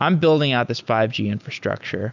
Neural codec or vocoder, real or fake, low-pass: none; real; 7.2 kHz